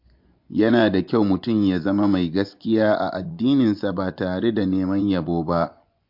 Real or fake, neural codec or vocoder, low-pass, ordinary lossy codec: fake; vocoder, 24 kHz, 100 mel bands, Vocos; 5.4 kHz; MP3, 48 kbps